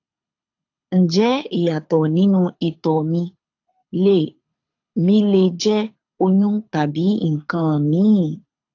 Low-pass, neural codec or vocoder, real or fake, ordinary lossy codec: 7.2 kHz; codec, 24 kHz, 6 kbps, HILCodec; fake; AAC, 48 kbps